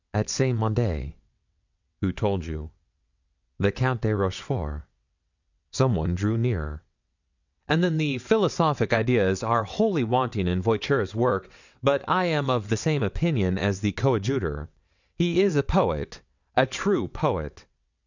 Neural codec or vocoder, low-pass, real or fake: vocoder, 22.05 kHz, 80 mel bands, WaveNeXt; 7.2 kHz; fake